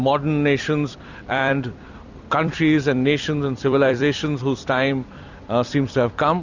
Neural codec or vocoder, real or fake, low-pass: vocoder, 44.1 kHz, 128 mel bands every 256 samples, BigVGAN v2; fake; 7.2 kHz